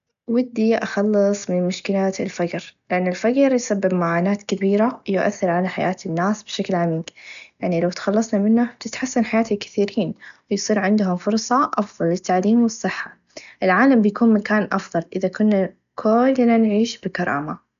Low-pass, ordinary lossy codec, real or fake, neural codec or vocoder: 7.2 kHz; none; real; none